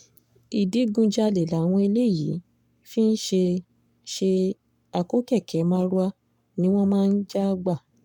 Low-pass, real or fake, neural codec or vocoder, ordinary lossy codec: 19.8 kHz; fake; codec, 44.1 kHz, 7.8 kbps, Pupu-Codec; none